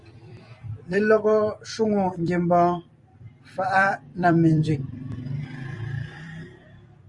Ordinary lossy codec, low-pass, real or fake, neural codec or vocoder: Opus, 64 kbps; 10.8 kHz; fake; vocoder, 44.1 kHz, 128 mel bands every 256 samples, BigVGAN v2